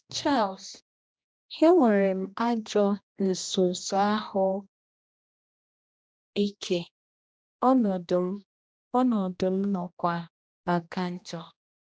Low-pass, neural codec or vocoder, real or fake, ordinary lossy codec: none; codec, 16 kHz, 1 kbps, X-Codec, HuBERT features, trained on general audio; fake; none